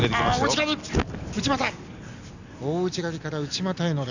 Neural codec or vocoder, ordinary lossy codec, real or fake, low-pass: none; none; real; 7.2 kHz